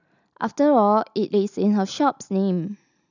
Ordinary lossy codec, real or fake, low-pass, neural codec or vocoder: none; real; 7.2 kHz; none